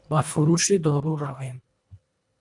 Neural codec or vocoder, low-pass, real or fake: codec, 24 kHz, 1.5 kbps, HILCodec; 10.8 kHz; fake